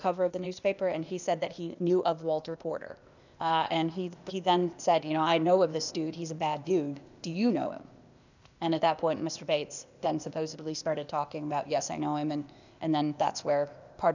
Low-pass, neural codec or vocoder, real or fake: 7.2 kHz; codec, 16 kHz, 0.8 kbps, ZipCodec; fake